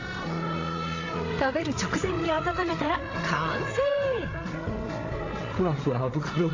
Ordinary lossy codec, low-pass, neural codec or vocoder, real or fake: AAC, 32 kbps; 7.2 kHz; codec, 16 kHz, 8 kbps, FreqCodec, larger model; fake